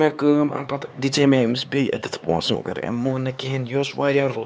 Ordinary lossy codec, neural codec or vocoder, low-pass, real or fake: none; codec, 16 kHz, 4 kbps, X-Codec, HuBERT features, trained on LibriSpeech; none; fake